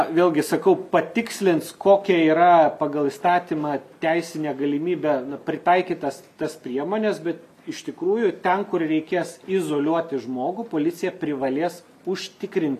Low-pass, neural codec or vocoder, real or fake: 14.4 kHz; none; real